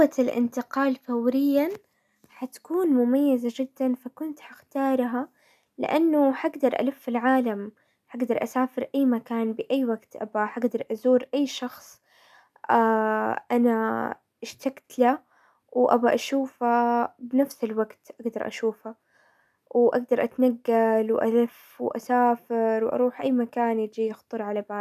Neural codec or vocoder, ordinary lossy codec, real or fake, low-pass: none; none; real; 14.4 kHz